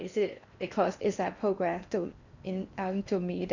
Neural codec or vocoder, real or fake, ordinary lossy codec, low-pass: codec, 16 kHz in and 24 kHz out, 0.6 kbps, FocalCodec, streaming, 4096 codes; fake; none; 7.2 kHz